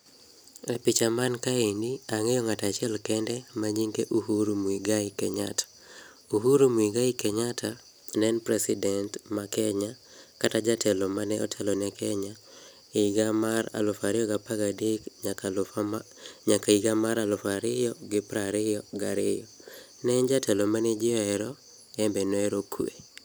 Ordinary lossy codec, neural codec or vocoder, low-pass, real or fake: none; none; none; real